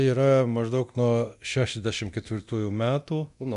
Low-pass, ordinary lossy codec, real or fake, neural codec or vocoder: 10.8 kHz; MP3, 96 kbps; fake; codec, 24 kHz, 0.9 kbps, DualCodec